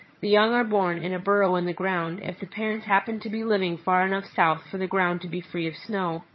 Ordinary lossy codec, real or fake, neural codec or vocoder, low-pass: MP3, 24 kbps; fake; vocoder, 22.05 kHz, 80 mel bands, HiFi-GAN; 7.2 kHz